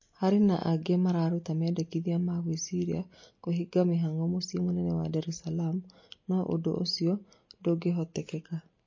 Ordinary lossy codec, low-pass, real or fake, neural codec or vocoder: MP3, 32 kbps; 7.2 kHz; real; none